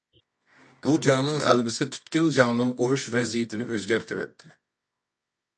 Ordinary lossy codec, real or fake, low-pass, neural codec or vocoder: MP3, 48 kbps; fake; 10.8 kHz; codec, 24 kHz, 0.9 kbps, WavTokenizer, medium music audio release